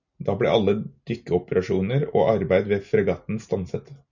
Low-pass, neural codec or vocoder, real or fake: 7.2 kHz; none; real